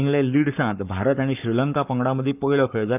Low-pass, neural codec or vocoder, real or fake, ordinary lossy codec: 3.6 kHz; codec, 44.1 kHz, 7.8 kbps, Pupu-Codec; fake; none